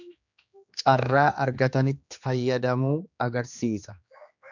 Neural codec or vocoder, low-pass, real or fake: codec, 16 kHz, 2 kbps, X-Codec, HuBERT features, trained on general audio; 7.2 kHz; fake